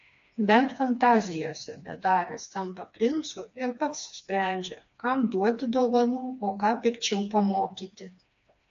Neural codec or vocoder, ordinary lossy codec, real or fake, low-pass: codec, 16 kHz, 2 kbps, FreqCodec, smaller model; AAC, 48 kbps; fake; 7.2 kHz